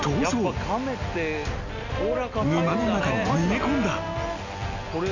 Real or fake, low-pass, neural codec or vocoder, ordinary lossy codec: real; 7.2 kHz; none; none